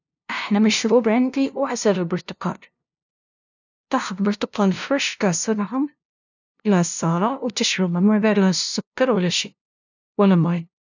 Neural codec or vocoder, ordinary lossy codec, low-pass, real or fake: codec, 16 kHz, 0.5 kbps, FunCodec, trained on LibriTTS, 25 frames a second; none; 7.2 kHz; fake